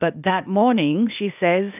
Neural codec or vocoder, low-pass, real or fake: codec, 16 kHz, 0.8 kbps, ZipCodec; 3.6 kHz; fake